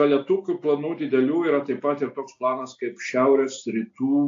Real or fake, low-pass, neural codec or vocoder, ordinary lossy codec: real; 7.2 kHz; none; AAC, 48 kbps